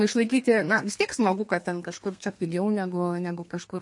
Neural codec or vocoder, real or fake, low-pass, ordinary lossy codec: codec, 32 kHz, 1.9 kbps, SNAC; fake; 10.8 kHz; MP3, 48 kbps